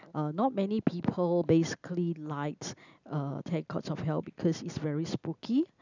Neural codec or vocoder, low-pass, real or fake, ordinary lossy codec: none; 7.2 kHz; real; none